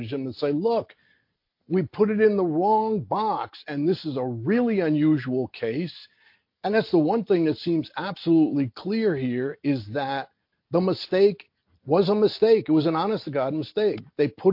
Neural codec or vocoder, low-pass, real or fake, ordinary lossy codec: none; 5.4 kHz; real; MP3, 32 kbps